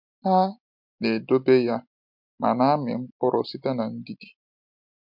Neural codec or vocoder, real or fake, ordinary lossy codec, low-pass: none; real; MP3, 48 kbps; 5.4 kHz